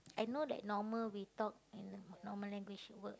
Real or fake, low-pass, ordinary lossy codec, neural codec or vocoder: real; none; none; none